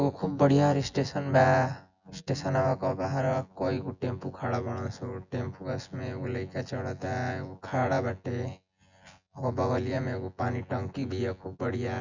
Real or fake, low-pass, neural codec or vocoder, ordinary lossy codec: fake; 7.2 kHz; vocoder, 24 kHz, 100 mel bands, Vocos; none